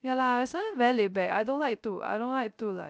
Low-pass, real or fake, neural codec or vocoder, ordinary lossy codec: none; fake; codec, 16 kHz, 0.3 kbps, FocalCodec; none